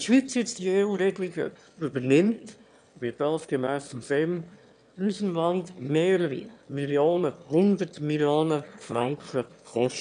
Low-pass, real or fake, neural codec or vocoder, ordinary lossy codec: 9.9 kHz; fake; autoencoder, 22.05 kHz, a latent of 192 numbers a frame, VITS, trained on one speaker; none